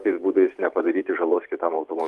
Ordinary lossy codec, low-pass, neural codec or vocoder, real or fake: Opus, 32 kbps; 14.4 kHz; vocoder, 44.1 kHz, 128 mel bands every 512 samples, BigVGAN v2; fake